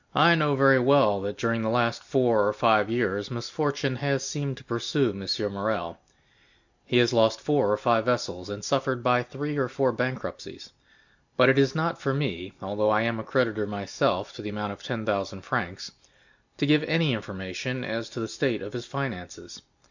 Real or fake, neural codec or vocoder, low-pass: real; none; 7.2 kHz